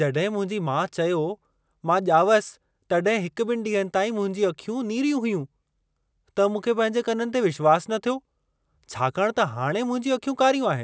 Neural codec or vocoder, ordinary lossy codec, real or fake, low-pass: none; none; real; none